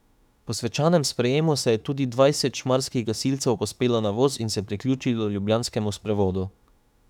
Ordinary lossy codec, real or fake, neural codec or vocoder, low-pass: none; fake; autoencoder, 48 kHz, 32 numbers a frame, DAC-VAE, trained on Japanese speech; 19.8 kHz